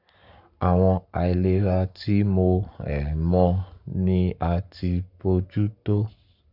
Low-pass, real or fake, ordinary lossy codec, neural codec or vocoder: 5.4 kHz; fake; none; codec, 44.1 kHz, 7.8 kbps, Pupu-Codec